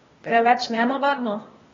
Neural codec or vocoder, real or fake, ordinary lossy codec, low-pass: codec, 16 kHz, 0.8 kbps, ZipCodec; fake; AAC, 24 kbps; 7.2 kHz